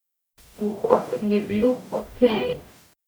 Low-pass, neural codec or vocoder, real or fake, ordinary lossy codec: none; codec, 44.1 kHz, 0.9 kbps, DAC; fake; none